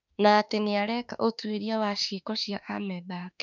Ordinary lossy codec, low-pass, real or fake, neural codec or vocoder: none; 7.2 kHz; fake; autoencoder, 48 kHz, 32 numbers a frame, DAC-VAE, trained on Japanese speech